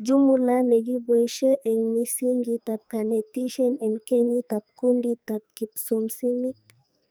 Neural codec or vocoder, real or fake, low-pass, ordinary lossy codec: codec, 44.1 kHz, 2.6 kbps, SNAC; fake; none; none